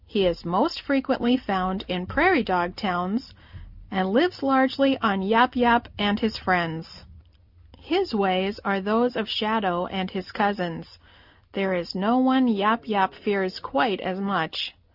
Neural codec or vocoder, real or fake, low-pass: none; real; 5.4 kHz